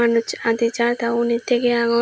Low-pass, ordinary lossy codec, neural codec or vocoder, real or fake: none; none; none; real